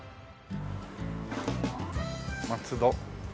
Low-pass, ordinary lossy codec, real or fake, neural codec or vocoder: none; none; real; none